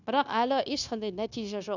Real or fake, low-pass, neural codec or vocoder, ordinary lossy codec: fake; 7.2 kHz; codec, 16 kHz, 0.9 kbps, LongCat-Audio-Codec; none